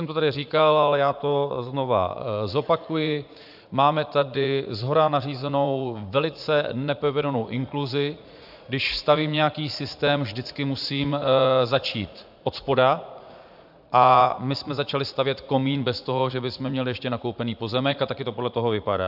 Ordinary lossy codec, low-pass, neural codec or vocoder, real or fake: AAC, 48 kbps; 5.4 kHz; vocoder, 44.1 kHz, 80 mel bands, Vocos; fake